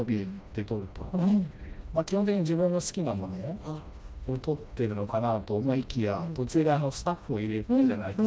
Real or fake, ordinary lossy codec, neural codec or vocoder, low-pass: fake; none; codec, 16 kHz, 1 kbps, FreqCodec, smaller model; none